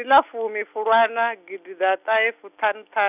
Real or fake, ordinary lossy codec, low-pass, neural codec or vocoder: real; none; 3.6 kHz; none